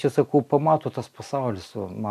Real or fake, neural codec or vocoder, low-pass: real; none; 14.4 kHz